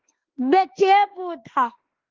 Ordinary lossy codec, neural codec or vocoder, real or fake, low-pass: Opus, 16 kbps; autoencoder, 48 kHz, 32 numbers a frame, DAC-VAE, trained on Japanese speech; fake; 7.2 kHz